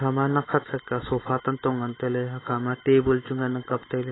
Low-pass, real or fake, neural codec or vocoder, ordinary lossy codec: 7.2 kHz; real; none; AAC, 16 kbps